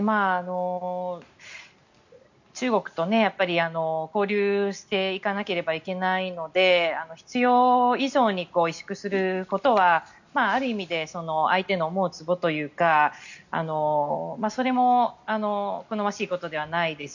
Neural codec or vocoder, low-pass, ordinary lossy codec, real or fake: none; 7.2 kHz; none; real